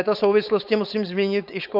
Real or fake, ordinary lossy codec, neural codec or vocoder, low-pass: fake; Opus, 64 kbps; codec, 16 kHz, 4.8 kbps, FACodec; 5.4 kHz